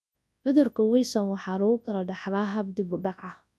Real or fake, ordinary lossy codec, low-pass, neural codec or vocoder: fake; none; none; codec, 24 kHz, 0.9 kbps, WavTokenizer, large speech release